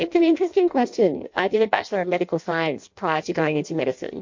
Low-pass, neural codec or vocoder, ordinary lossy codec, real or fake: 7.2 kHz; codec, 16 kHz in and 24 kHz out, 0.6 kbps, FireRedTTS-2 codec; MP3, 48 kbps; fake